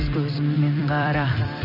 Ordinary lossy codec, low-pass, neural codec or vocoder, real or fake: none; 5.4 kHz; vocoder, 44.1 kHz, 128 mel bands every 256 samples, BigVGAN v2; fake